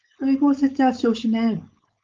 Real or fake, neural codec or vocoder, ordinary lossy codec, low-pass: fake; codec, 16 kHz, 4.8 kbps, FACodec; Opus, 24 kbps; 7.2 kHz